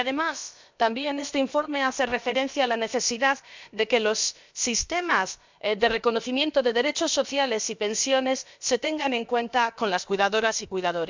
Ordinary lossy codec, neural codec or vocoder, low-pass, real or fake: MP3, 64 kbps; codec, 16 kHz, about 1 kbps, DyCAST, with the encoder's durations; 7.2 kHz; fake